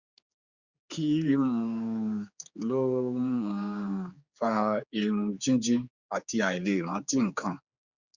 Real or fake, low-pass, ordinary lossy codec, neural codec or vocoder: fake; 7.2 kHz; Opus, 64 kbps; codec, 16 kHz, 4 kbps, X-Codec, HuBERT features, trained on general audio